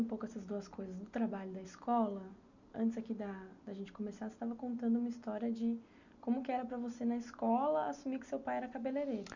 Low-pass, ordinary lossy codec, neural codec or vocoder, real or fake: 7.2 kHz; none; none; real